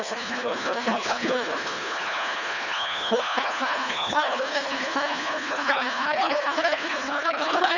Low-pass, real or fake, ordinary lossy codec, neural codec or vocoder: 7.2 kHz; fake; none; codec, 24 kHz, 1.5 kbps, HILCodec